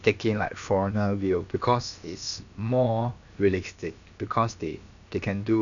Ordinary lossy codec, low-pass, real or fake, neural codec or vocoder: none; 7.2 kHz; fake; codec, 16 kHz, about 1 kbps, DyCAST, with the encoder's durations